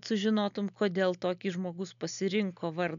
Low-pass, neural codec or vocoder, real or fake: 7.2 kHz; none; real